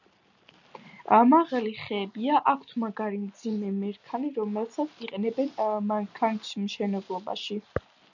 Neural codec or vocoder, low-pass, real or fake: none; 7.2 kHz; real